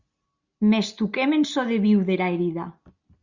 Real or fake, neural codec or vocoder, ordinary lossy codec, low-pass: real; none; Opus, 64 kbps; 7.2 kHz